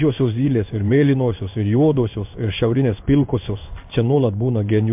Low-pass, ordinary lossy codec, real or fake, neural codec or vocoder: 3.6 kHz; MP3, 32 kbps; fake; codec, 16 kHz in and 24 kHz out, 1 kbps, XY-Tokenizer